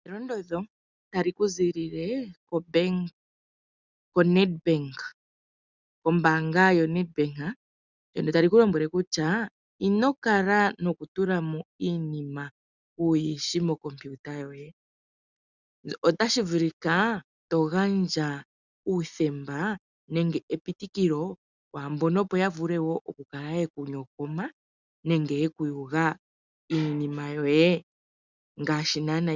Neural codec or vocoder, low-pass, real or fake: none; 7.2 kHz; real